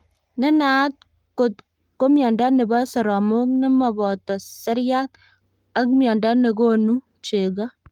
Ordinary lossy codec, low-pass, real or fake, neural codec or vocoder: Opus, 32 kbps; 19.8 kHz; fake; codec, 44.1 kHz, 7.8 kbps, Pupu-Codec